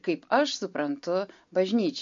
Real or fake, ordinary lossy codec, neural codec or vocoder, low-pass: real; MP3, 48 kbps; none; 7.2 kHz